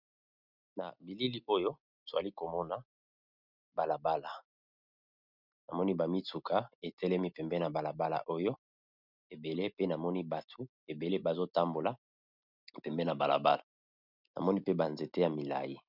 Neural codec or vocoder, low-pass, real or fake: none; 5.4 kHz; real